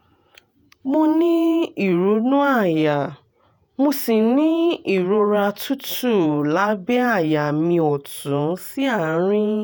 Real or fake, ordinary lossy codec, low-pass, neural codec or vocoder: fake; none; none; vocoder, 48 kHz, 128 mel bands, Vocos